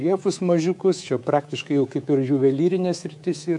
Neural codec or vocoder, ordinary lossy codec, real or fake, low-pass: codec, 24 kHz, 3.1 kbps, DualCodec; MP3, 64 kbps; fake; 10.8 kHz